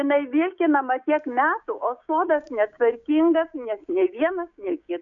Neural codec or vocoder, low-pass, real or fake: codec, 16 kHz, 6 kbps, DAC; 7.2 kHz; fake